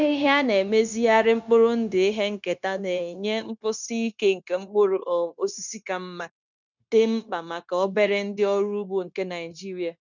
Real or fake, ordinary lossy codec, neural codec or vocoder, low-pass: fake; none; codec, 16 kHz, 0.9 kbps, LongCat-Audio-Codec; 7.2 kHz